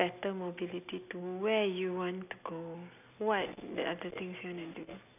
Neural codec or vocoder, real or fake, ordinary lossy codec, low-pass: none; real; none; 3.6 kHz